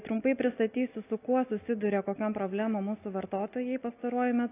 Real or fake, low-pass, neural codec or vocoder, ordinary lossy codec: real; 3.6 kHz; none; AAC, 24 kbps